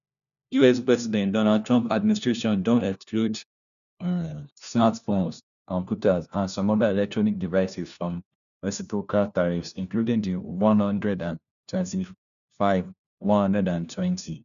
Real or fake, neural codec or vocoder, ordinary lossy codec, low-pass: fake; codec, 16 kHz, 1 kbps, FunCodec, trained on LibriTTS, 50 frames a second; none; 7.2 kHz